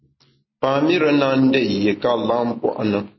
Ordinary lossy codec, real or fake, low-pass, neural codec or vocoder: MP3, 24 kbps; real; 7.2 kHz; none